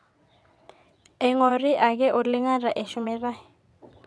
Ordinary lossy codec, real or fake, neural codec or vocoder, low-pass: none; fake; vocoder, 22.05 kHz, 80 mel bands, WaveNeXt; none